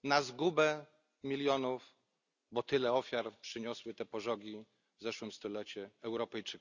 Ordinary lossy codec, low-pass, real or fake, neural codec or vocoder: none; 7.2 kHz; real; none